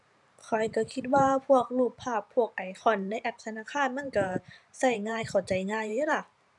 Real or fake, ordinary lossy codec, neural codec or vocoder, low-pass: fake; none; vocoder, 44.1 kHz, 128 mel bands every 512 samples, BigVGAN v2; 10.8 kHz